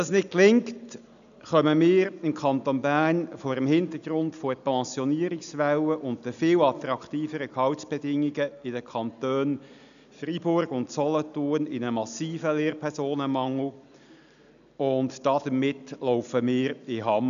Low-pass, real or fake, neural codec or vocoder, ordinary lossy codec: 7.2 kHz; real; none; none